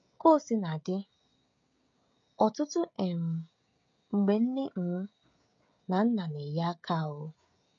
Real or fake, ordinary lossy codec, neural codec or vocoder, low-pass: fake; MP3, 48 kbps; codec, 16 kHz, 16 kbps, FreqCodec, smaller model; 7.2 kHz